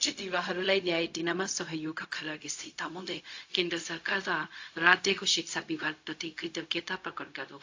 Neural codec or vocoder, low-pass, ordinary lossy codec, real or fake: codec, 16 kHz, 0.4 kbps, LongCat-Audio-Codec; 7.2 kHz; AAC, 48 kbps; fake